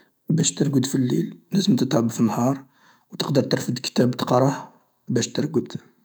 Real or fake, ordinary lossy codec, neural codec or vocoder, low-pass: fake; none; autoencoder, 48 kHz, 128 numbers a frame, DAC-VAE, trained on Japanese speech; none